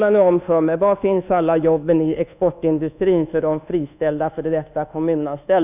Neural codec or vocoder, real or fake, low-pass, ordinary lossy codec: codec, 24 kHz, 1.2 kbps, DualCodec; fake; 3.6 kHz; none